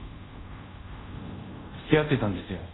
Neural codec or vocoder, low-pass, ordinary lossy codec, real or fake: codec, 24 kHz, 0.5 kbps, DualCodec; 7.2 kHz; AAC, 16 kbps; fake